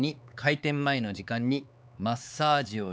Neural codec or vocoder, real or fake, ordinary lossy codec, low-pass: codec, 16 kHz, 4 kbps, X-Codec, HuBERT features, trained on LibriSpeech; fake; none; none